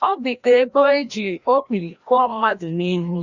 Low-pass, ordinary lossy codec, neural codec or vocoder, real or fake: 7.2 kHz; Opus, 64 kbps; codec, 16 kHz, 1 kbps, FreqCodec, larger model; fake